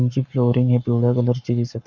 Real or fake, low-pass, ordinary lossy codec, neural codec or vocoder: fake; 7.2 kHz; none; codec, 44.1 kHz, 7.8 kbps, Pupu-Codec